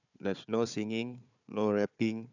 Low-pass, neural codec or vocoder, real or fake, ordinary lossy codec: 7.2 kHz; codec, 16 kHz, 4 kbps, FunCodec, trained on Chinese and English, 50 frames a second; fake; none